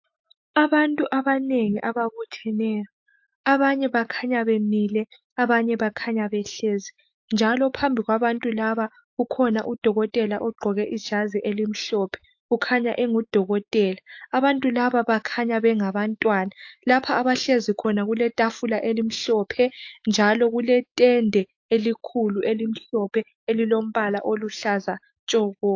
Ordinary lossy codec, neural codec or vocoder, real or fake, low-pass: AAC, 48 kbps; autoencoder, 48 kHz, 128 numbers a frame, DAC-VAE, trained on Japanese speech; fake; 7.2 kHz